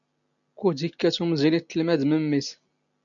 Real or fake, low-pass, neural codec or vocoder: real; 7.2 kHz; none